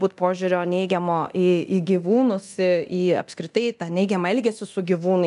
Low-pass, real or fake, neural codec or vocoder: 10.8 kHz; fake; codec, 24 kHz, 0.9 kbps, DualCodec